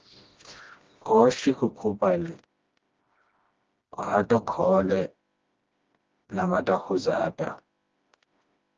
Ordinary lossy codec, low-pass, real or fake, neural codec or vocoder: Opus, 24 kbps; 7.2 kHz; fake; codec, 16 kHz, 1 kbps, FreqCodec, smaller model